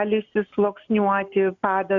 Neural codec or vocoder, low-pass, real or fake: none; 7.2 kHz; real